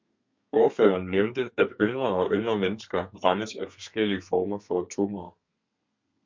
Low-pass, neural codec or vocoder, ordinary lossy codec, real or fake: 7.2 kHz; codec, 32 kHz, 1.9 kbps, SNAC; MP3, 64 kbps; fake